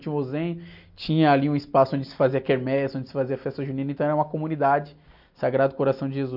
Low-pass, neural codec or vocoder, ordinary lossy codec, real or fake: 5.4 kHz; none; none; real